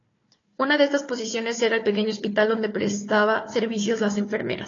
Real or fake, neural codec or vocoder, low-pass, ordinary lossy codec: fake; codec, 16 kHz, 4 kbps, FunCodec, trained on Chinese and English, 50 frames a second; 7.2 kHz; AAC, 32 kbps